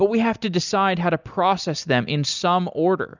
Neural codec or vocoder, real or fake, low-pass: none; real; 7.2 kHz